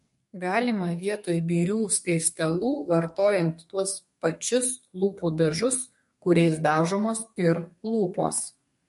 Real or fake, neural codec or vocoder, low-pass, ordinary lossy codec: fake; codec, 32 kHz, 1.9 kbps, SNAC; 14.4 kHz; MP3, 48 kbps